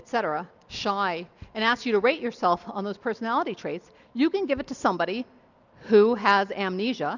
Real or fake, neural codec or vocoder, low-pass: real; none; 7.2 kHz